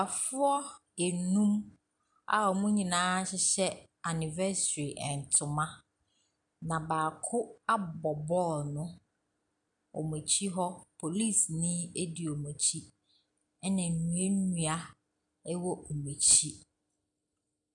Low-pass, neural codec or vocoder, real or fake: 10.8 kHz; none; real